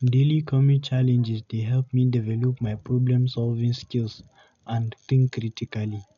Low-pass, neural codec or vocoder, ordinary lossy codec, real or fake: 7.2 kHz; none; none; real